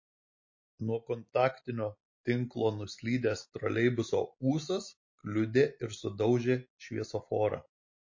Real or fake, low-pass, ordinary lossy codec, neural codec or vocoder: real; 7.2 kHz; MP3, 32 kbps; none